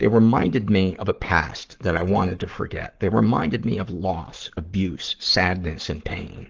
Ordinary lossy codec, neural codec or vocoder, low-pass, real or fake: Opus, 16 kbps; codec, 44.1 kHz, 7.8 kbps, Pupu-Codec; 7.2 kHz; fake